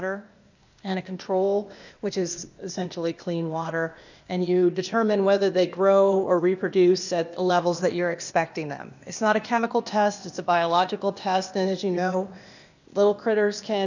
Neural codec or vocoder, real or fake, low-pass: codec, 16 kHz, 0.8 kbps, ZipCodec; fake; 7.2 kHz